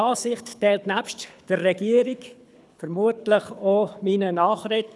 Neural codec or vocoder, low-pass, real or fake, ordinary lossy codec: codec, 24 kHz, 6 kbps, HILCodec; none; fake; none